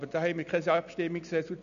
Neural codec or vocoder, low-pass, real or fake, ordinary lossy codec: none; 7.2 kHz; real; none